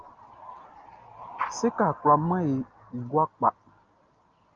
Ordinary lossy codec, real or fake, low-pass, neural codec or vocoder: Opus, 24 kbps; real; 7.2 kHz; none